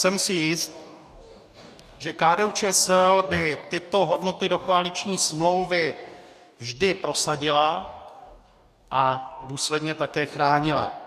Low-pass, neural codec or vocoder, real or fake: 14.4 kHz; codec, 44.1 kHz, 2.6 kbps, DAC; fake